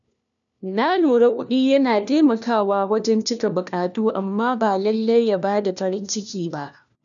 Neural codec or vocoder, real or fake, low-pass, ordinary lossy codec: codec, 16 kHz, 1 kbps, FunCodec, trained on LibriTTS, 50 frames a second; fake; 7.2 kHz; none